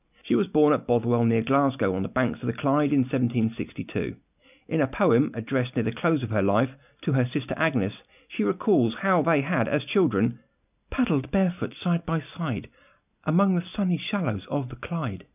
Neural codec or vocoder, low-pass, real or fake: none; 3.6 kHz; real